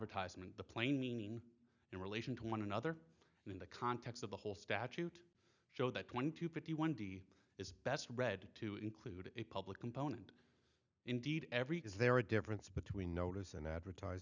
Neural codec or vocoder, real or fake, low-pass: none; real; 7.2 kHz